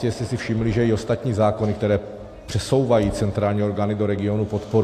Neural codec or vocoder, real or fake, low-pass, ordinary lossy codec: none; real; 14.4 kHz; AAC, 64 kbps